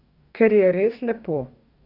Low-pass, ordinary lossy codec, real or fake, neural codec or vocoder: 5.4 kHz; none; fake; codec, 44.1 kHz, 2.6 kbps, DAC